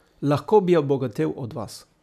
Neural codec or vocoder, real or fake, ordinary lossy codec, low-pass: none; real; none; 14.4 kHz